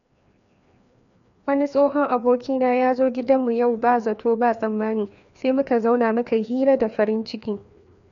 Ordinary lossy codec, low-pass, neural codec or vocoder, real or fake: none; 7.2 kHz; codec, 16 kHz, 2 kbps, FreqCodec, larger model; fake